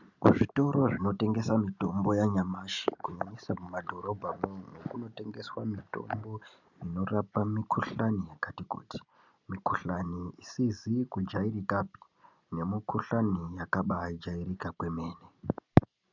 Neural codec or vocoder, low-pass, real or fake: none; 7.2 kHz; real